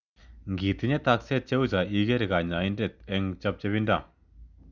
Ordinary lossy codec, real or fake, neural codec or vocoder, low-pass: none; real; none; 7.2 kHz